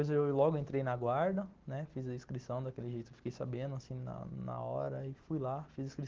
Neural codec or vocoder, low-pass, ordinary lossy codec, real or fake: none; 7.2 kHz; Opus, 16 kbps; real